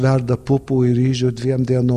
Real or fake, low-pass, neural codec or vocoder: real; 14.4 kHz; none